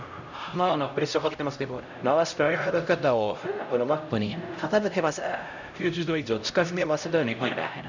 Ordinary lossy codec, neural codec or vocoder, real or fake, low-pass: none; codec, 16 kHz, 0.5 kbps, X-Codec, HuBERT features, trained on LibriSpeech; fake; 7.2 kHz